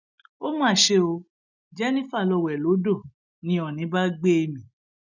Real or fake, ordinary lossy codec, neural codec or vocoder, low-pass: real; none; none; 7.2 kHz